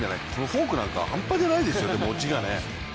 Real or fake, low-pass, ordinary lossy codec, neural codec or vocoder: real; none; none; none